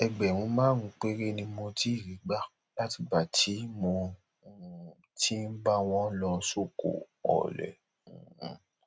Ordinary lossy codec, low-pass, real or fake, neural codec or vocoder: none; none; real; none